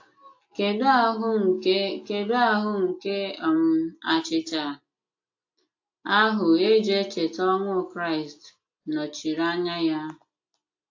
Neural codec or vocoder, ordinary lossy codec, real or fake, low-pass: none; AAC, 48 kbps; real; 7.2 kHz